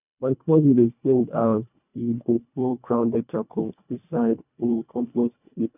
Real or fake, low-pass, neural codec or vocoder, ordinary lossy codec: fake; 3.6 kHz; codec, 24 kHz, 1.5 kbps, HILCodec; none